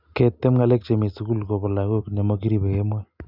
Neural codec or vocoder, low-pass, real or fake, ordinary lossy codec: none; 5.4 kHz; real; none